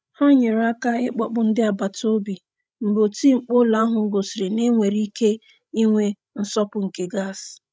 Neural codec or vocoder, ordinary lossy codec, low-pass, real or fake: codec, 16 kHz, 16 kbps, FreqCodec, larger model; none; none; fake